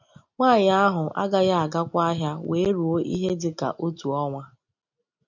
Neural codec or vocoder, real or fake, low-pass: none; real; 7.2 kHz